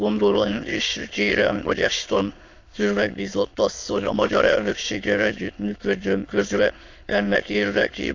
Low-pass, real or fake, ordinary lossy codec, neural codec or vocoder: 7.2 kHz; fake; AAC, 48 kbps; autoencoder, 22.05 kHz, a latent of 192 numbers a frame, VITS, trained on many speakers